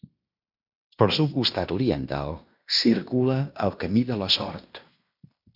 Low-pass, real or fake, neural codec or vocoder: 5.4 kHz; fake; codec, 16 kHz in and 24 kHz out, 0.9 kbps, LongCat-Audio-Codec, fine tuned four codebook decoder